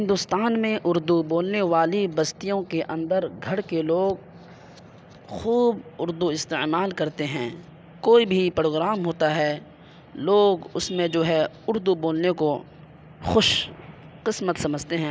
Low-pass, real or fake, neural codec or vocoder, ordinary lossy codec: none; real; none; none